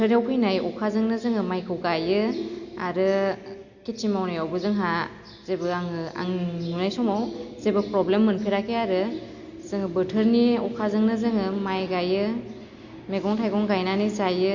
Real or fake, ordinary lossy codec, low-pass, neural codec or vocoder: real; Opus, 64 kbps; 7.2 kHz; none